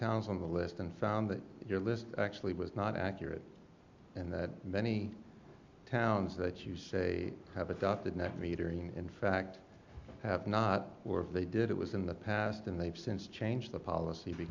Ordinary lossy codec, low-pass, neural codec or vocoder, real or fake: MP3, 64 kbps; 7.2 kHz; none; real